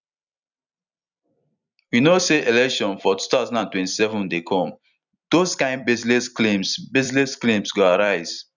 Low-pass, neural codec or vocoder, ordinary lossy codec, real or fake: 7.2 kHz; vocoder, 44.1 kHz, 128 mel bands every 256 samples, BigVGAN v2; none; fake